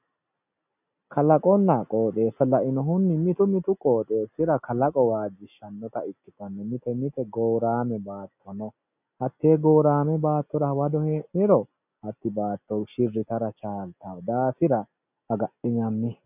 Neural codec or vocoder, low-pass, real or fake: none; 3.6 kHz; real